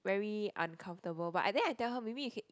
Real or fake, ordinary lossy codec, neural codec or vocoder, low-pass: real; none; none; none